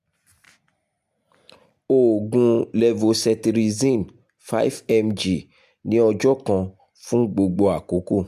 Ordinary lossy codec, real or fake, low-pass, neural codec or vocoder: MP3, 96 kbps; real; 14.4 kHz; none